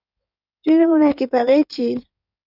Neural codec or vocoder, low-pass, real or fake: codec, 16 kHz in and 24 kHz out, 2.2 kbps, FireRedTTS-2 codec; 5.4 kHz; fake